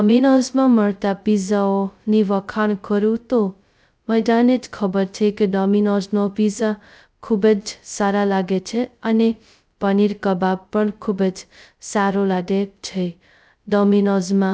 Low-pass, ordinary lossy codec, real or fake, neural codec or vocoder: none; none; fake; codec, 16 kHz, 0.2 kbps, FocalCodec